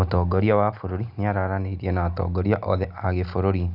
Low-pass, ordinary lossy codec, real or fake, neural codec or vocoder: 5.4 kHz; none; real; none